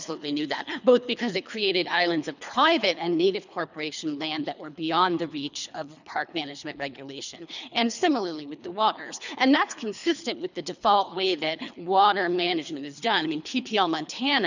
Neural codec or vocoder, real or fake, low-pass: codec, 24 kHz, 3 kbps, HILCodec; fake; 7.2 kHz